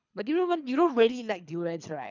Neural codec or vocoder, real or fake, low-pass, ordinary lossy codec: codec, 24 kHz, 3 kbps, HILCodec; fake; 7.2 kHz; none